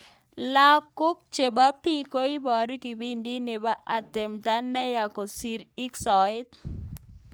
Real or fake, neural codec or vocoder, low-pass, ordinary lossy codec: fake; codec, 44.1 kHz, 3.4 kbps, Pupu-Codec; none; none